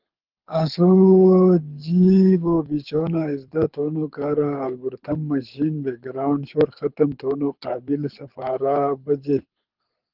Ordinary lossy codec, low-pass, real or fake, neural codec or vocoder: Opus, 24 kbps; 5.4 kHz; fake; codec, 24 kHz, 6 kbps, HILCodec